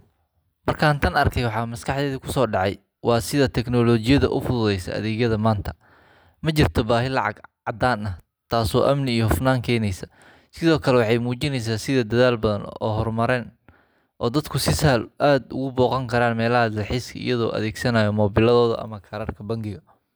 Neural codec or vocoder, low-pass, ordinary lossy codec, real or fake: none; none; none; real